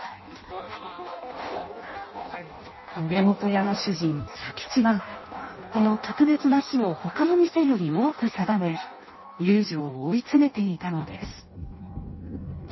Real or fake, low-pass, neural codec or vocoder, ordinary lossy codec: fake; 7.2 kHz; codec, 16 kHz in and 24 kHz out, 0.6 kbps, FireRedTTS-2 codec; MP3, 24 kbps